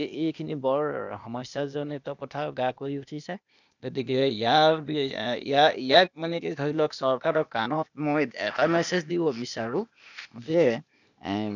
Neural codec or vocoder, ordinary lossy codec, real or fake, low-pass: codec, 16 kHz, 0.8 kbps, ZipCodec; none; fake; 7.2 kHz